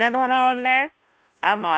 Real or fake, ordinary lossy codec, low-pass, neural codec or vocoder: fake; none; none; codec, 16 kHz, 0.5 kbps, FunCodec, trained on Chinese and English, 25 frames a second